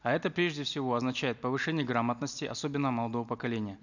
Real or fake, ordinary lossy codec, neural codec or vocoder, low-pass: real; none; none; 7.2 kHz